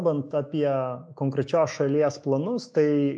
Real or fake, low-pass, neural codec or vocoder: fake; 9.9 kHz; autoencoder, 48 kHz, 128 numbers a frame, DAC-VAE, trained on Japanese speech